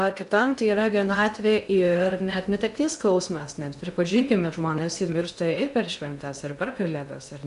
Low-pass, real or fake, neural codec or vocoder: 10.8 kHz; fake; codec, 16 kHz in and 24 kHz out, 0.6 kbps, FocalCodec, streaming, 2048 codes